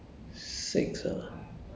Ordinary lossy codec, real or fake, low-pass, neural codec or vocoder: none; real; none; none